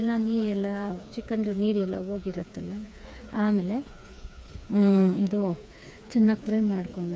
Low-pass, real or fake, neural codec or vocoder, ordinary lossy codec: none; fake; codec, 16 kHz, 4 kbps, FreqCodec, smaller model; none